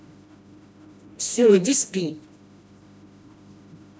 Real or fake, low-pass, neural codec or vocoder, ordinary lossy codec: fake; none; codec, 16 kHz, 1 kbps, FreqCodec, smaller model; none